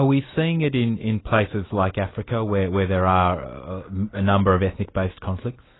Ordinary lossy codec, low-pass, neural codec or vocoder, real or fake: AAC, 16 kbps; 7.2 kHz; none; real